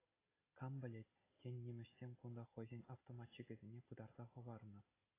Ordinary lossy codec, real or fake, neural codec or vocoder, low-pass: AAC, 16 kbps; real; none; 3.6 kHz